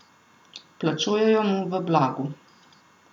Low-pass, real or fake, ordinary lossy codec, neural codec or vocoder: 19.8 kHz; real; none; none